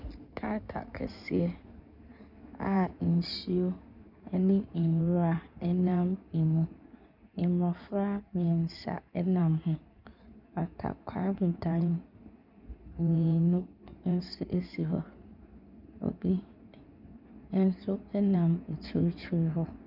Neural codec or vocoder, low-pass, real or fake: codec, 16 kHz in and 24 kHz out, 2.2 kbps, FireRedTTS-2 codec; 5.4 kHz; fake